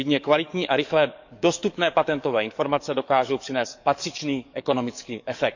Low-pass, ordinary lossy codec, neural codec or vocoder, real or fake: 7.2 kHz; none; codec, 44.1 kHz, 7.8 kbps, DAC; fake